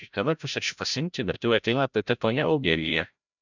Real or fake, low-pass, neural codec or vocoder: fake; 7.2 kHz; codec, 16 kHz, 0.5 kbps, FreqCodec, larger model